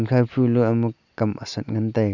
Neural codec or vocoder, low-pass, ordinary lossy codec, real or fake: none; 7.2 kHz; none; real